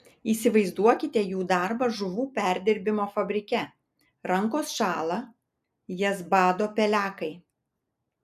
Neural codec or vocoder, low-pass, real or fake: none; 14.4 kHz; real